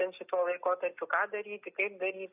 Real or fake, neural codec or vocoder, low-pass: real; none; 3.6 kHz